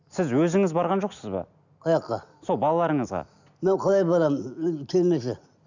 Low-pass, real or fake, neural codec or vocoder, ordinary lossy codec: 7.2 kHz; real; none; none